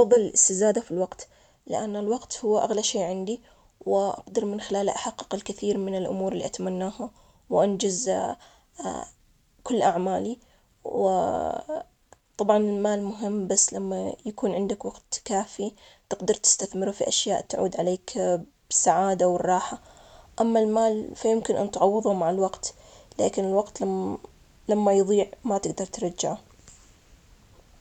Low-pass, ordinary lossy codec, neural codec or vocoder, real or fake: 19.8 kHz; none; none; real